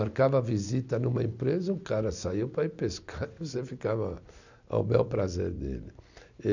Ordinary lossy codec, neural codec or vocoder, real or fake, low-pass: none; none; real; 7.2 kHz